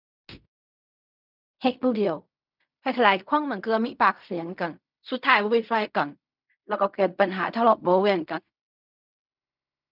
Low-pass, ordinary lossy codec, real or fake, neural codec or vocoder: 5.4 kHz; none; fake; codec, 16 kHz in and 24 kHz out, 0.4 kbps, LongCat-Audio-Codec, fine tuned four codebook decoder